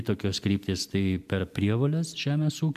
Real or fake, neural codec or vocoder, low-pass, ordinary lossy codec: real; none; 14.4 kHz; MP3, 96 kbps